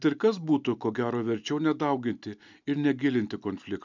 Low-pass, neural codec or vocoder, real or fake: 7.2 kHz; none; real